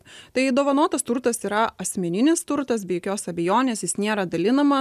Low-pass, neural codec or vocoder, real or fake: 14.4 kHz; none; real